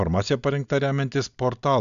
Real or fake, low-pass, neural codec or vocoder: real; 7.2 kHz; none